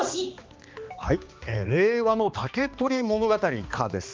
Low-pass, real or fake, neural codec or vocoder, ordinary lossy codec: 7.2 kHz; fake; codec, 16 kHz, 2 kbps, X-Codec, HuBERT features, trained on balanced general audio; Opus, 24 kbps